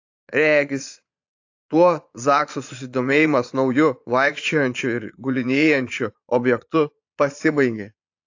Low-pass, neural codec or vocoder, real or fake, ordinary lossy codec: 7.2 kHz; vocoder, 22.05 kHz, 80 mel bands, Vocos; fake; AAC, 48 kbps